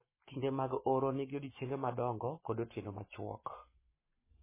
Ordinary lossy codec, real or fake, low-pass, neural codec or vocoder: MP3, 16 kbps; fake; 3.6 kHz; codec, 44.1 kHz, 7.8 kbps, Pupu-Codec